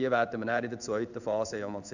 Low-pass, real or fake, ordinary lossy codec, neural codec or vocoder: 7.2 kHz; fake; none; codec, 16 kHz in and 24 kHz out, 1 kbps, XY-Tokenizer